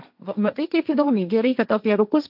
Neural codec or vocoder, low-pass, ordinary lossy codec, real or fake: codec, 16 kHz, 1.1 kbps, Voila-Tokenizer; 5.4 kHz; AAC, 48 kbps; fake